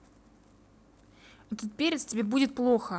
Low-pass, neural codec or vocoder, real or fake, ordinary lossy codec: none; none; real; none